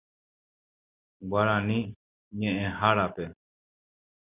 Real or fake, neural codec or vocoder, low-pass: real; none; 3.6 kHz